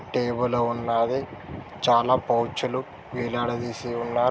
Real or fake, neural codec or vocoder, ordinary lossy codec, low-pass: real; none; none; none